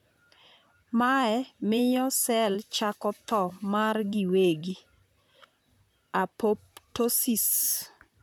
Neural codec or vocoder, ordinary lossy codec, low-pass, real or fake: vocoder, 44.1 kHz, 128 mel bands, Pupu-Vocoder; none; none; fake